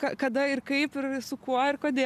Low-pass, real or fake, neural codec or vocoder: 14.4 kHz; real; none